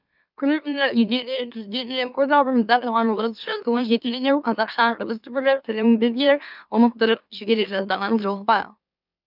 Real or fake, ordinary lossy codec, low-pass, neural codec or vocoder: fake; none; 5.4 kHz; autoencoder, 44.1 kHz, a latent of 192 numbers a frame, MeloTTS